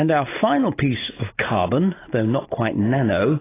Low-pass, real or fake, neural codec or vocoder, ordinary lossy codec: 3.6 kHz; real; none; AAC, 16 kbps